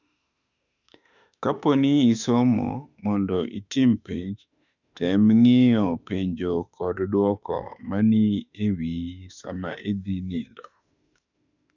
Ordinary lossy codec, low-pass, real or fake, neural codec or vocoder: none; 7.2 kHz; fake; autoencoder, 48 kHz, 32 numbers a frame, DAC-VAE, trained on Japanese speech